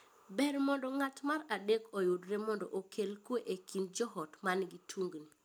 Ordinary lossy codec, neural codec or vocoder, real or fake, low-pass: none; none; real; none